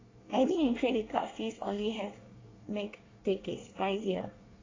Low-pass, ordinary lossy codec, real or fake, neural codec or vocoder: 7.2 kHz; AAC, 32 kbps; fake; codec, 24 kHz, 1 kbps, SNAC